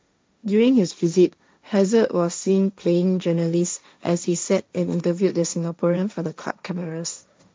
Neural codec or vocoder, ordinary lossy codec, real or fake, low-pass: codec, 16 kHz, 1.1 kbps, Voila-Tokenizer; none; fake; none